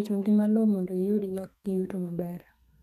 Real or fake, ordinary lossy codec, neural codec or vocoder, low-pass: fake; none; codec, 32 kHz, 1.9 kbps, SNAC; 14.4 kHz